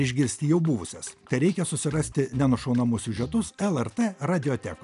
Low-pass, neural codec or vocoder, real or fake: 10.8 kHz; none; real